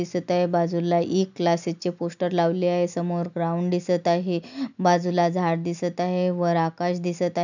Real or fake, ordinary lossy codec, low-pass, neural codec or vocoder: real; none; 7.2 kHz; none